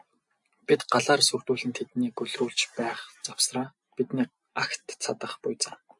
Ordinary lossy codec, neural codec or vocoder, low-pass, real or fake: AAC, 64 kbps; none; 10.8 kHz; real